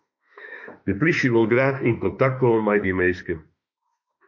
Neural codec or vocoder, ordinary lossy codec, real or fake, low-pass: autoencoder, 48 kHz, 32 numbers a frame, DAC-VAE, trained on Japanese speech; MP3, 48 kbps; fake; 7.2 kHz